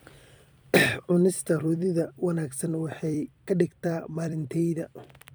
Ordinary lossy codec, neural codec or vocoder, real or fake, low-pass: none; vocoder, 44.1 kHz, 128 mel bands every 256 samples, BigVGAN v2; fake; none